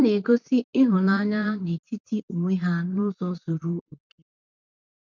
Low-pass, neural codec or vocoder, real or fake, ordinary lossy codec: 7.2 kHz; vocoder, 44.1 kHz, 128 mel bands, Pupu-Vocoder; fake; none